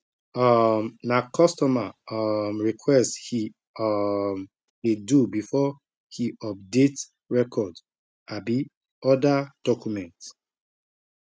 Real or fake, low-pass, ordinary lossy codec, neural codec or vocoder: real; none; none; none